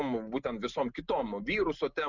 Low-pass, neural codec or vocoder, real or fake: 7.2 kHz; none; real